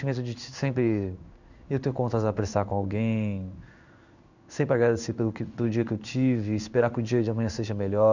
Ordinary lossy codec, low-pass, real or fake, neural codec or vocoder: none; 7.2 kHz; fake; codec, 16 kHz in and 24 kHz out, 1 kbps, XY-Tokenizer